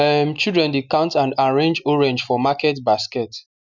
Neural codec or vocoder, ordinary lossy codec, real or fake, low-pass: none; none; real; 7.2 kHz